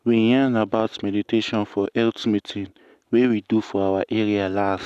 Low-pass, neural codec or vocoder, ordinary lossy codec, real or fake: 14.4 kHz; none; none; real